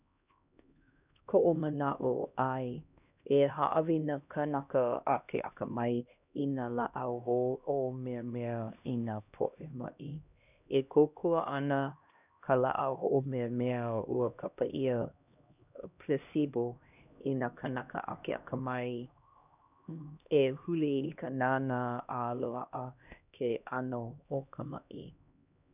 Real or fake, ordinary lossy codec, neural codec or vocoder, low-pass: fake; none; codec, 16 kHz, 1 kbps, X-Codec, HuBERT features, trained on LibriSpeech; 3.6 kHz